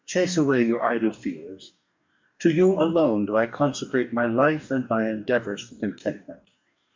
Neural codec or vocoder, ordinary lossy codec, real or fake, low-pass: codec, 44.1 kHz, 2.6 kbps, DAC; MP3, 64 kbps; fake; 7.2 kHz